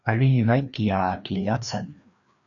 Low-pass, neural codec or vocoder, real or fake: 7.2 kHz; codec, 16 kHz, 2 kbps, FreqCodec, larger model; fake